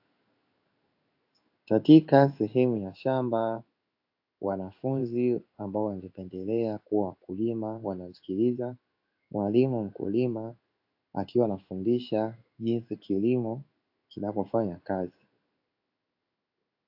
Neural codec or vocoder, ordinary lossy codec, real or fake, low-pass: codec, 16 kHz in and 24 kHz out, 1 kbps, XY-Tokenizer; AAC, 48 kbps; fake; 5.4 kHz